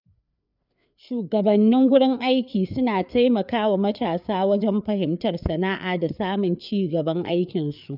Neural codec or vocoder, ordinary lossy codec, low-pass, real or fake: codec, 16 kHz, 4 kbps, FreqCodec, larger model; none; 5.4 kHz; fake